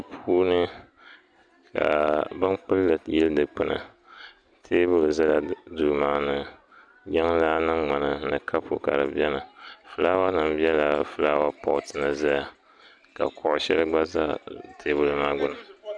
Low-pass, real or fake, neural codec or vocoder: 9.9 kHz; real; none